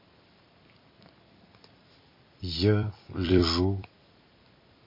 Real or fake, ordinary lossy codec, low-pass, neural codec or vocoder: fake; MP3, 24 kbps; 5.4 kHz; vocoder, 44.1 kHz, 128 mel bands every 256 samples, BigVGAN v2